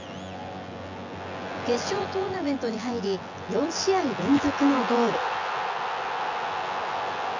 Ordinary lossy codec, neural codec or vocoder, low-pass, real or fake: AAC, 48 kbps; vocoder, 24 kHz, 100 mel bands, Vocos; 7.2 kHz; fake